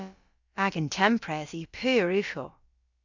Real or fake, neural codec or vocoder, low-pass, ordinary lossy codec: fake; codec, 16 kHz, about 1 kbps, DyCAST, with the encoder's durations; 7.2 kHz; Opus, 64 kbps